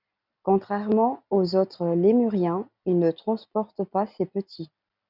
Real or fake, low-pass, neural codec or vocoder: real; 5.4 kHz; none